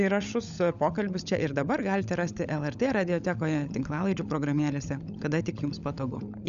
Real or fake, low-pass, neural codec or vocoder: fake; 7.2 kHz; codec, 16 kHz, 16 kbps, FunCodec, trained on LibriTTS, 50 frames a second